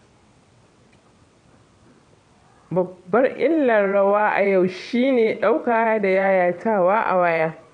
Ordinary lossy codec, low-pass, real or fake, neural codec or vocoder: none; 9.9 kHz; fake; vocoder, 22.05 kHz, 80 mel bands, WaveNeXt